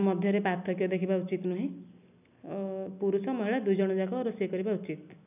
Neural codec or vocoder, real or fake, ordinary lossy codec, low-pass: none; real; none; 3.6 kHz